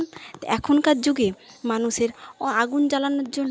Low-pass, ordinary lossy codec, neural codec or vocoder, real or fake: none; none; none; real